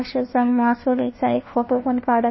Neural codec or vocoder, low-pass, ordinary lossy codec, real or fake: codec, 16 kHz, 1 kbps, FunCodec, trained on LibriTTS, 50 frames a second; 7.2 kHz; MP3, 24 kbps; fake